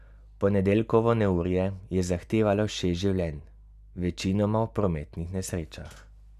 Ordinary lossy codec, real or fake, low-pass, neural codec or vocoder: none; real; 14.4 kHz; none